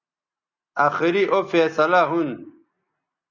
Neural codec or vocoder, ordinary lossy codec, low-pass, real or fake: none; Opus, 64 kbps; 7.2 kHz; real